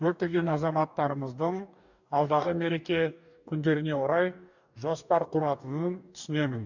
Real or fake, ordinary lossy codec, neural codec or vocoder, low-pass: fake; none; codec, 44.1 kHz, 2.6 kbps, DAC; 7.2 kHz